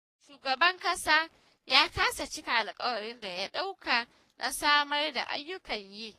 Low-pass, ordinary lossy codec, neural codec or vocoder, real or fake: 14.4 kHz; AAC, 48 kbps; codec, 44.1 kHz, 3.4 kbps, Pupu-Codec; fake